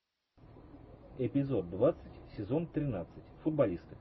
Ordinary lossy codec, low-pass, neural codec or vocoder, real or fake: MP3, 24 kbps; 7.2 kHz; none; real